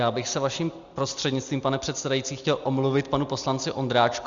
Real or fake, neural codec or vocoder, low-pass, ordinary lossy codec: real; none; 7.2 kHz; Opus, 64 kbps